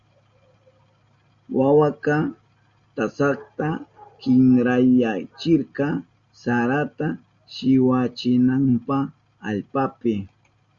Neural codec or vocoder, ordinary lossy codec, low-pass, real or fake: none; Opus, 64 kbps; 7.2 kHz; real